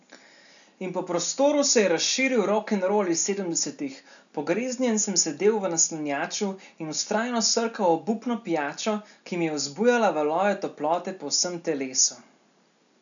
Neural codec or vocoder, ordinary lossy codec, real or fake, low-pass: none; none; real; 7.2 kHz